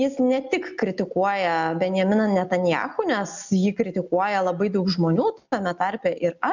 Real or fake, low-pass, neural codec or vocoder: real; 7.2 kHz; none